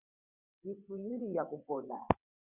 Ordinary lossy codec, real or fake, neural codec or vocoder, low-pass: Opus, 64 kbps; fake; vocoder, 22.05 kHz, 80 mel bands, WaveNeXt; 3.6 kHz